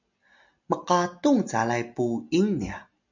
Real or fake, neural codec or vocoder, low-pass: real; none; 7.2 kHz